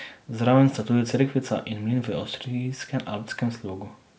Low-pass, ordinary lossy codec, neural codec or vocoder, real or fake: none; none; none; real